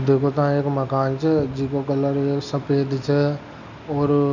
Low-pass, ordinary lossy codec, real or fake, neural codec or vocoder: 7.2 kHz; none; real; none